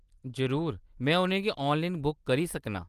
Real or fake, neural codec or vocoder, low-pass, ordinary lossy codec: fake; vocoder, 44.1 kHz, 128 mel bands every 256 samples, BigVGAN v2; 14.4 kHz; Opus, 24 kbps